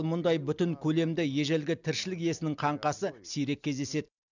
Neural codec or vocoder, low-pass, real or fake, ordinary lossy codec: none; 7.2 kHz; real; none